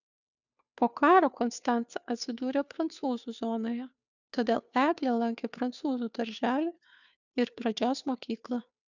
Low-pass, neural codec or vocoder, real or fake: 7.2 kHz; codec, 16 kHz, 2 kbps, FunCodec, trained on Chinese and English, 25 frames a second; fake